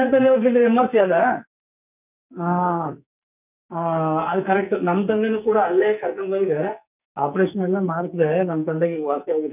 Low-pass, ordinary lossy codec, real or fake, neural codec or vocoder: 3.6 kHz; none; fake; codec, 44.1 kHz, 2.6 kbps, SNAC